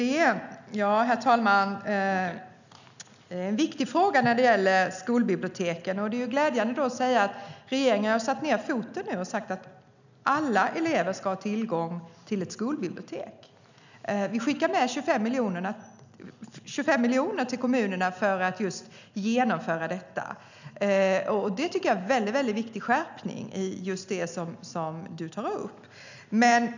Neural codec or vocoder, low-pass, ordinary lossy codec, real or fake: none; 7.2 kHz; none; real